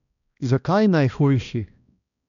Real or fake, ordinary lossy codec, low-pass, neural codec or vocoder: fake; none; 7.2 kHz; codec, 16 kHz, 1 kbps, X-Codec, HuBERT features, trained on balanced general audio